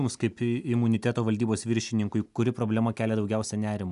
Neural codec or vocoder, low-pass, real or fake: none; 10.8 kHz; real